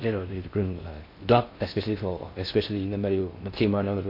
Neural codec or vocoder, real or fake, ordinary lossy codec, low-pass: codec, 16 kHz in and 24 kHz out, 0.6 kbps, FocalCodec, streaming, 4096 codes; fake; MP3, 32 kbps; 5.4 kHz